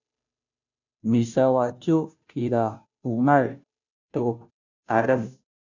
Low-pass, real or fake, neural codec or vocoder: 7.2 kHz; fake; codec, 16 kHz, 0.5 kbps, FunCodec, trained on Chinese and English, 25 frames a second